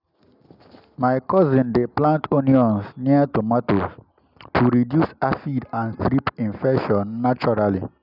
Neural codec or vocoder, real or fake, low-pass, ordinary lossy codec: none; real; 5.4 kHz; none